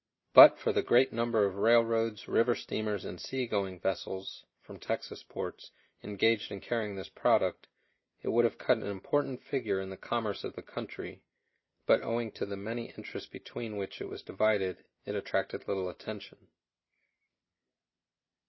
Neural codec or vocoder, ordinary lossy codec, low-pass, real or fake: none; MP3, 24 kbps; 7.2 kHz; real